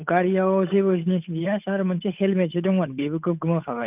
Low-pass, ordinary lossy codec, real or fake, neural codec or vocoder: 3.6 kHz; none; fake; codec, 16 kHz, 8 kbps, FunCodec, trained on Chinese and English, 25 frames a second